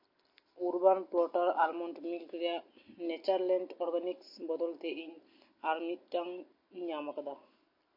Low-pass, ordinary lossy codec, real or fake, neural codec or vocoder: 5.4 kHz; MP3, 32 kbps; real; none